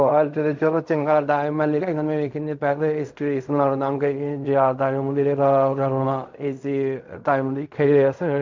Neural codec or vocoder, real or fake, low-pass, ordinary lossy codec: codec, 16 kHz in and 24 kHz out, 0.4 kbps, LongCat-Audio-Codec, fine tuned four codebook decoder; fake; 7.2 kHz; MP3, 64 kbps